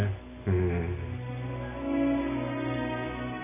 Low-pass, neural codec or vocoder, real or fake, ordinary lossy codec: 3.6 kHz; none; real; none